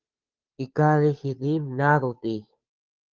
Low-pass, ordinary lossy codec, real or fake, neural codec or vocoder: 7.2 kHz; Opus, 32 kbps; fake; codec, 16 kHz, 2 kbps, FunCodec, trained on Chinese and English, 25 frames a second